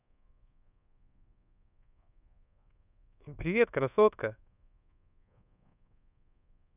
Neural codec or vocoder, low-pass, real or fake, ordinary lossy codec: codec, 24 kHz, 1.2 kbps, DualCodec; 3.6 kHz; fake; none